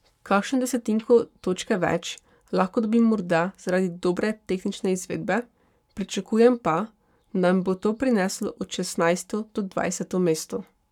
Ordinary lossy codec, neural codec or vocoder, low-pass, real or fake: none; codec, 44.1 kHz, 7.8 kbps, Pupu-Codec; 19.8 kHz; fake